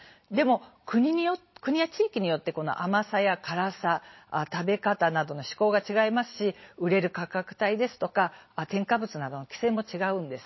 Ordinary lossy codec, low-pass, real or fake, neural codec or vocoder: MP3, 24 kbps; 7.2 kHz; real; none